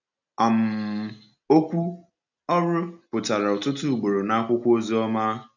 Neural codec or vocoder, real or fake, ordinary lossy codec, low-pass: none; real; none; 7.2 kHz